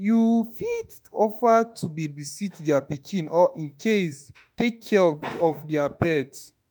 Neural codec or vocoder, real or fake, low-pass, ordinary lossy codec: autoencoder, 48 kHz, 32 numbers a frame, DAC-VAE, trained on Japanese speech; fake; none; none